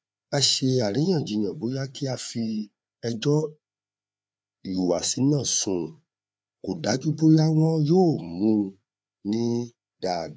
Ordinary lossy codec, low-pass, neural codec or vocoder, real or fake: none; none; codec, 16 kHz, 4 kbps, FreqCodec, larger model; fake